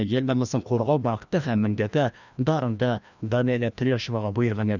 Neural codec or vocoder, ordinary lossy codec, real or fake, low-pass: codec, 16 kHz, 1 kbps, FreqCodec, larger model; none; fake; 7.2 kHz